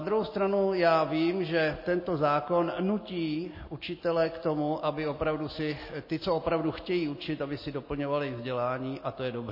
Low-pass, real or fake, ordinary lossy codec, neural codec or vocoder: 5.4 kHz; real; MP3, 24 kbps; none